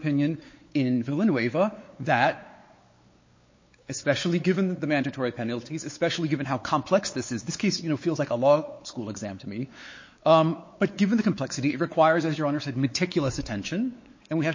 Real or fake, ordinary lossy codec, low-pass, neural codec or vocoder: fake; MP3, 32 kbps; 7.2 kHz; codec, 16 kHz, 4 kbps, X-Codec, WavLM features, trained on Multilingual LibriSpeech